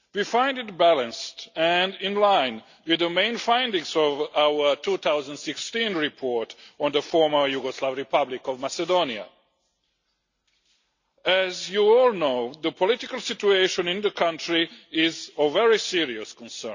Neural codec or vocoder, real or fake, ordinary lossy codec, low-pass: none; real; Opus, 64 kbps; 7.2 kHz